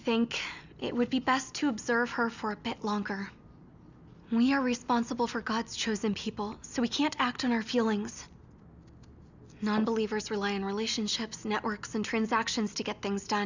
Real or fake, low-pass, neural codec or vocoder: real; 7.2 kHz; none